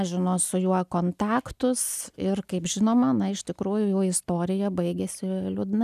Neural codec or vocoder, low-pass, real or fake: none; 14.4 kHz; real